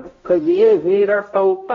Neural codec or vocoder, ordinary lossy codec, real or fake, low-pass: codec, 16 kHz, 0.5 kbps, X-Codec, HuBERT features, trained on balanced general audio; AAC, 24 kbps; fake; 7.2 kHz